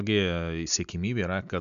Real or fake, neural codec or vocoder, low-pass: real; none; 7.2 kHz